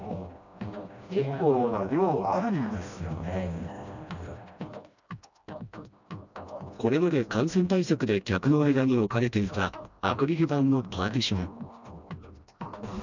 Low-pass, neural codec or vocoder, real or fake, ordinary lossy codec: 7.2 kHz; codec, 16 kHz, 1 kbps, FreqCodec, smaller model; fake; none